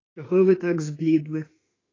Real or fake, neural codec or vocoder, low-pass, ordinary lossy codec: fake; autoencoder, 48 kHz, 32 numbers a frame, DAC-VAE, trained on Japanese speech; 7.2 kHz; AAC, 32 kbps